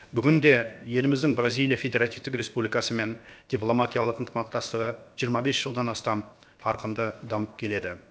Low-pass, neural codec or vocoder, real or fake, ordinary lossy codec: none; codec, 16 kHz, about 1 kbps, DyCAST, with the encoder's durations; fake; none